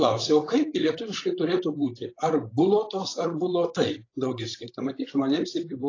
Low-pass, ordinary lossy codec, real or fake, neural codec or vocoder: 7.2 kHz; AAC, 32 kbps; fake; codec, 16 kHz, 16 kbps, FreqCodec, larger model